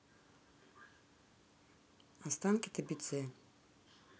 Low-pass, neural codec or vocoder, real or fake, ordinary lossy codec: none; none; real; none